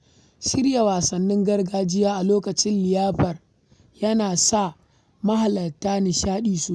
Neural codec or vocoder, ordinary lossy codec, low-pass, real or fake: none; none; none; real